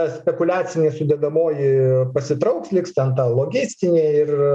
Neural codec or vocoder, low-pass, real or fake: none; 10.8 kHz; real